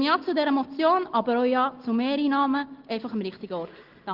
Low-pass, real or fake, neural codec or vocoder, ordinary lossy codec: 5.4 kHz; real; none; Opus, 16 kbps